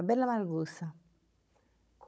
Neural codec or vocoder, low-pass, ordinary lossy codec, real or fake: codec, 16 kHz, 16 kbps, FunCodec, trained on Chinese and English, 50 frames a second; none; none; fake